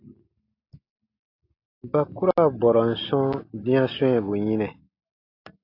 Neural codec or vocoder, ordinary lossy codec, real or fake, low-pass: none; AAC, 32 kbps; real; 5.4 kHz